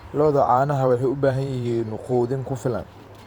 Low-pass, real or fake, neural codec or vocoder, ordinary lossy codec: 19.8 kHz; fake; vocoder, 44.1 kHz, 128 mel bands every 512 samples, BigVGAN v2; Opus, 64 kbps